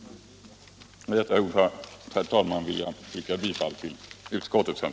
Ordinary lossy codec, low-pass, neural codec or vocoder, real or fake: none; none; none; real